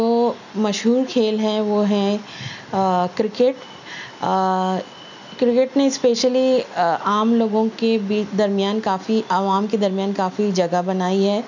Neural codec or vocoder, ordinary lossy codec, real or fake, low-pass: none; none; real; 7.2 kHz